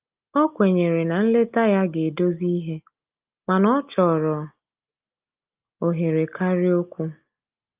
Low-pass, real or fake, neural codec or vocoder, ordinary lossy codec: 3.6 kHz; real; none; Opus, 24 kbps